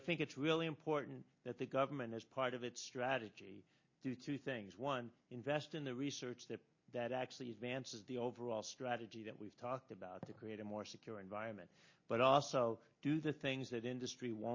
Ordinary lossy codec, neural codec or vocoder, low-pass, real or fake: MP3, 32 kbps; none; 7.2 kHz; real